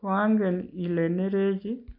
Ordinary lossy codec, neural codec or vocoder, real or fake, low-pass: none; none; real; 5.4 kHz